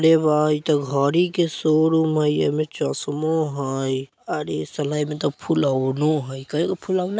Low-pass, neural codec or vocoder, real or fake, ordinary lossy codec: none; none; real; none